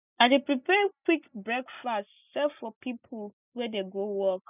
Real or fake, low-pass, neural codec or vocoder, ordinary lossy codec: real; 3.6 kHz; none; none